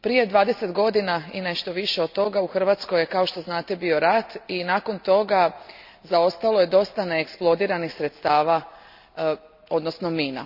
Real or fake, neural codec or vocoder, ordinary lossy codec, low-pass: real; none; none; 5.4 kHz